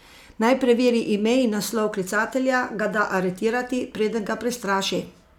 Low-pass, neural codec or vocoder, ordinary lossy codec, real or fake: 19.8 kHz; none; none; real